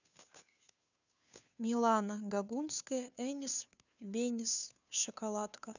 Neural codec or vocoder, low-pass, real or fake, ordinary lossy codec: codec, 16 kHz, 2 kbps, FunCodec, trained on Chinese and English, 25 frames a second; 7.2 kHz; fake; none